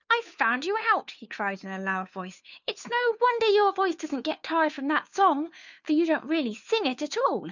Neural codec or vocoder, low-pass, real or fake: codec, 16 kHz, 2 kbps, FunCodec, trained on Chinese and English, 25 frames a second; 7.2 kHz; fake